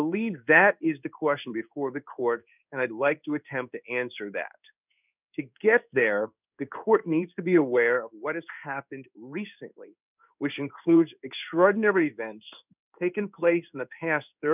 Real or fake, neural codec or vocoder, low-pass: fake; codec, 16 kHz in and 24 kHz out, 1 kbps, XY-Tokenizer; 3.6 kHz